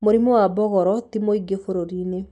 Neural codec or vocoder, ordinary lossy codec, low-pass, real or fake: none; none; 10.8 kHz; real